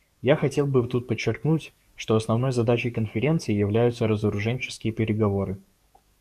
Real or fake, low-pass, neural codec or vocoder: fake; 14.4 kHz; codec, 44.1 kHz, 7.8 kbps, DAC